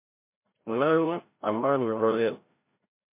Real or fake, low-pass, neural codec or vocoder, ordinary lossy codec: fake; 3.6 kHz; codec, 16 kHz, 0.5 kbps, FreqCodec, larger model; MP3, 24 kbps